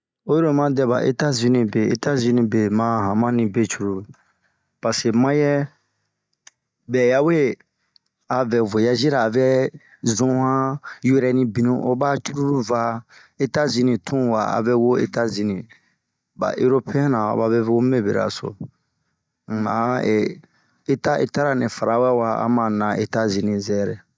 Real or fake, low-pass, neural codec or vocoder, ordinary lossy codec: real; none; none; none